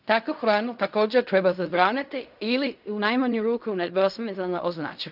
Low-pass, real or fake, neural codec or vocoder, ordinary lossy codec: 5.4 kHz; fake; codec, 16 kHz in and 24 kHz out, 0.4 kbps, LongCat-Audio-Codec, fine tuned four codebook decoder; none